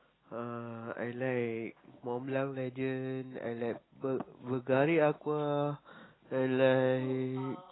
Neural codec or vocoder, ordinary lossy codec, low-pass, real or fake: none; AAC, 16 kbps; 7.2 kHz; real